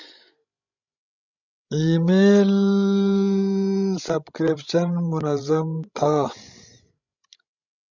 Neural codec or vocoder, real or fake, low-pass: codec, 16 kHz, 16 kbps, FreqCodec, larger model; fake; 7.2 kHz